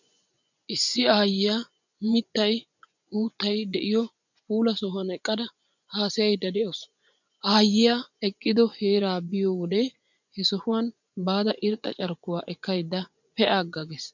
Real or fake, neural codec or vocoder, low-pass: fake; vocoder, 24 kHz, 100 mel bands, Vocos; 7.2 kHz